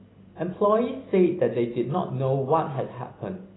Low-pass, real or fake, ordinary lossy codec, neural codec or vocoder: 7.2 kHz; real; AAC, 16 kbps; none